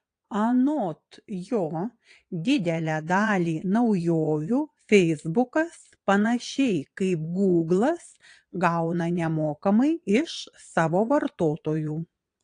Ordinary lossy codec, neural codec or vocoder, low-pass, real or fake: AAC, 48 kbps; vocoder, 22.05 kHz, 80 mel bands, Vocos; 9.9 kHz; fake